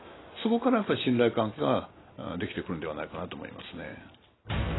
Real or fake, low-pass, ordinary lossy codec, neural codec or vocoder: real; 7.2 kHz; AAC, 16 kbps; none